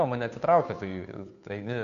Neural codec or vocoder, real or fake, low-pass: codec, 16 kHz, 2 kbps, FunCodec, trained on Chinese and English, 25 frames a second; fake; 7.2 kHz